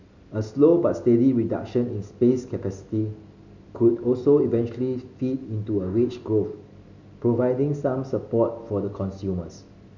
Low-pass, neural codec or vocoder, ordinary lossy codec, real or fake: 7.2 kHz; none; none; real